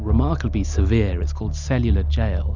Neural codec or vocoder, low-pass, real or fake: none; 7.2 kHz; real